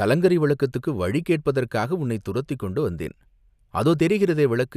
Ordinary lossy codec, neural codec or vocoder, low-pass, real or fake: none; none; 14.4 kHz; real